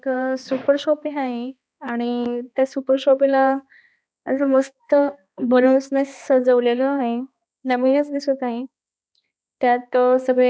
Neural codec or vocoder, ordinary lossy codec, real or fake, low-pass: codec, 16 kHz, 2 kbps, X-Codec, HuBERT features, trained on balanced general audio; none; fake; none